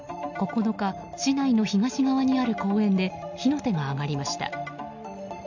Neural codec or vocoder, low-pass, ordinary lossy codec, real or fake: none; 7.2 kHz; none; real